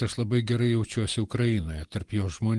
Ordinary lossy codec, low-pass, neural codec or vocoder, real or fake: Opus, 24 kbps; 10.8 kHz; vocoder, 48 kHz, 128 mel bands, Vocos; fake